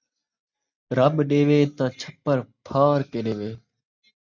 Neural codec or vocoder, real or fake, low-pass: none; real; 7.2 kHz